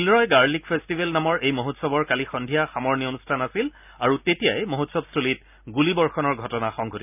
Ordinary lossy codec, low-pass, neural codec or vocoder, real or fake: none; 3.6 kHz; none; real